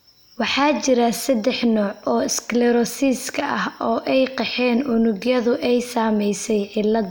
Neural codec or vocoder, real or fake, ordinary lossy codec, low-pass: none; real; none; none